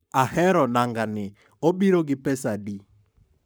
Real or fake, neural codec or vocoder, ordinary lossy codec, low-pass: fake; codec, 44.1 kHz, 7.8 kbps, Pupu-Codec; none; none